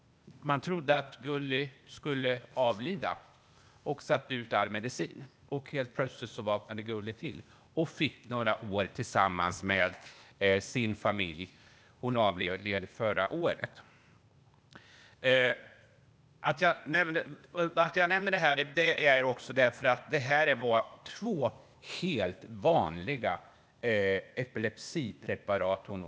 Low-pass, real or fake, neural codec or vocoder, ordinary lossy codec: none; fake; codec, 16 kHz, 0.8 kbps, ZipCodec; none